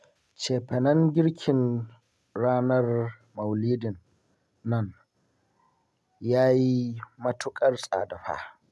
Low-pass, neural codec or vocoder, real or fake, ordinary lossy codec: none; none; real; none